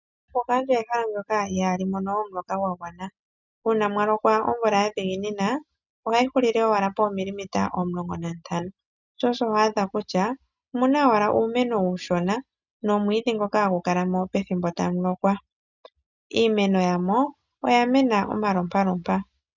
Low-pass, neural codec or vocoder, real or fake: 7.2 kHz; none; real